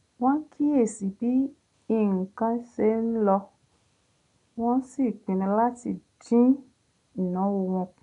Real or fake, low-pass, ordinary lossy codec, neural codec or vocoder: real; 10.8 kHz; none; none